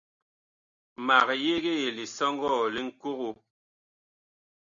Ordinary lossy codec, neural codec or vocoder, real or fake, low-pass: MP3, 64 kbps; none; real; 7.2 kHz